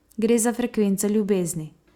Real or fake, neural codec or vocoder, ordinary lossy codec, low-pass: real; none; Opus, 64 kbps; 19.8 kHz